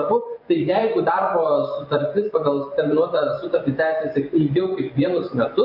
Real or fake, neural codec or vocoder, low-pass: fake; vocoder, 24 kHz, 100 mel bands, Vocos; 5.4 kHz